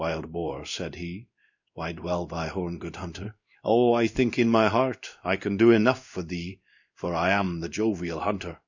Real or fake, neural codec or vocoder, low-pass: fake; codec, 16 kHz in and 24 kHz out, 1 kbps, XY-Tokenizer; 7.2 kHz